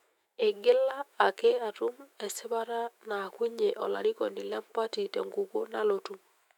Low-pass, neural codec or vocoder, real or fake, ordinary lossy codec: 19.8 kHz; autoencoder, 48 kHz, 128 numbers a frame, DAC-VAE, trained on Japanese speech; fake; none